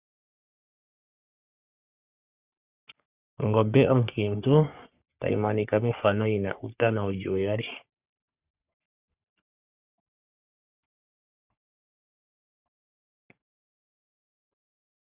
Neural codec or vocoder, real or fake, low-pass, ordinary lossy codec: codec, 44.1 kHz, 3.4 kbps, Pupu-Codec; fake; 3.6 kHz; Opus, 64 kbps